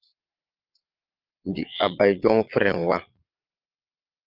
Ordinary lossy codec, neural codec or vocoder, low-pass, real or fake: Opus, 24 kbps; vocoder, 22.05 kHz, 80 mel bands, Vocos; 5.4 kHz; fake